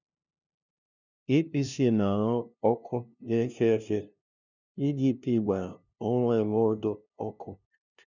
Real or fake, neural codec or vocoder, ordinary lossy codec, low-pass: fake; codec, 16 kHz, 0.5 kbps, FunCodec, trained on LibriTTS, 25 frames a second; none; 7.2 kHz